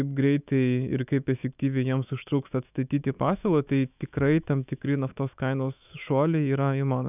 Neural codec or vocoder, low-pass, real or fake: autoencoder, 48 kHz, 128 numbers a frame, DAC-VAE, trained on Japanese speech; 3.6 kHz; fake